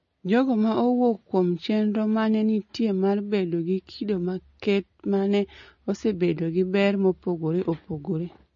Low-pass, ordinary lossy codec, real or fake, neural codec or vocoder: 7.2 kHz; MP3, 32 kbps; real; none